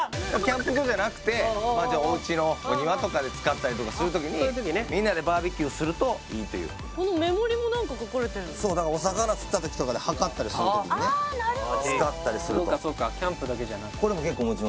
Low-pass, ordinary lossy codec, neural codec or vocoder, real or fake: none; none; none; real